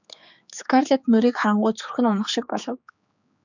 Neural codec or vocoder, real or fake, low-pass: codec, 16 kHz, 4 kbps, X-Codec, HuBERT features, trained on general audio; fake; 7.2 kHz